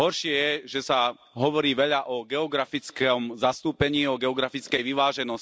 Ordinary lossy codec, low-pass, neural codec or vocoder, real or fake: none; none; none; real